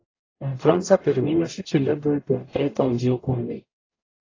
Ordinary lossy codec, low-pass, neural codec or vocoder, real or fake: AAC, 32 kbps; 7.2 kHz; codec, 44.1 kHz, 0.9 kbps, DAC; fake